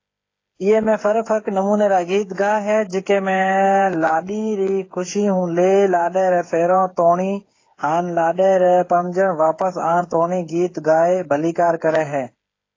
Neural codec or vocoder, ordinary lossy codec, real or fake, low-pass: codec, 16 kHz, 8 kbps, FreqCodec, smaller model; AAC, 32 kbps; fake; 7.2 kHz